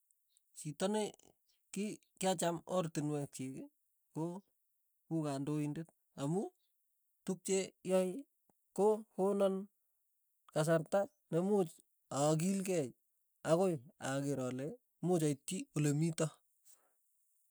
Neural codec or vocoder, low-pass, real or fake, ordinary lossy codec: none; none; real; none